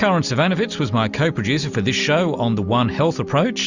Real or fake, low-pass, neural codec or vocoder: real; 7.2 kHz; none